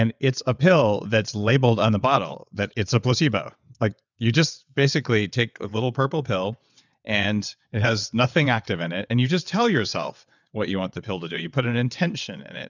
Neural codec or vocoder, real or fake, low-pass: vocoder, 22.05 kHz, 80 mel bands, WaveNeXt; fake; 7.2 kHz